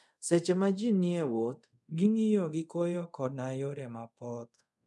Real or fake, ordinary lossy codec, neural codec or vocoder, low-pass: fake; none; codec, 24 kHz, 0.5 kbps, DualCodec; none